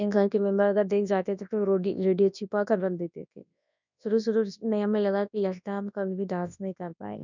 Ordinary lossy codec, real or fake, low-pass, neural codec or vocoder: none; fake; 7.2 kHz; codec, 24 kHz, 0.9 kbps, WavTokenizer, large speech release